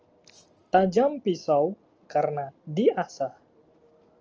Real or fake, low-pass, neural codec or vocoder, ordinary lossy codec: real; 7.2 kHz; none; Opus, 24 kbps